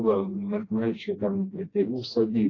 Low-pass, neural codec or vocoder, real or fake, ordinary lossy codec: 7.2 kHz; codec, 16 kHz, 1 kbps, FreqCodec, smaller model; fake; AAC, 32 kbps